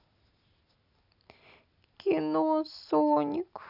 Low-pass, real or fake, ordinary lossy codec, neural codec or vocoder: 5.4 kHz; real; none; none